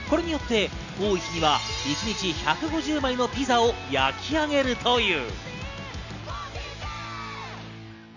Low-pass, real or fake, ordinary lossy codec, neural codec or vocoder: 7.2 kHz; real; none; none